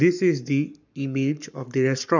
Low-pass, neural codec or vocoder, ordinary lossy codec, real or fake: 7.2 kHz; codec, 44.1 kHz, 7.8 kbps, Pupu-Codec; none; fake